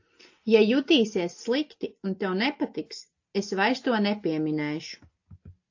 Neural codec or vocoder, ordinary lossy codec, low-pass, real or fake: none; AAC, 48 kbps; 7.2 kHz; real